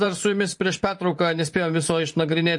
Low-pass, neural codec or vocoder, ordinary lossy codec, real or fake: 10.8 kHz; vocoder, 44.1 kHz, 128 mel bands every 512 samples, BigVGAN v2; MP3, 48 kbps; fake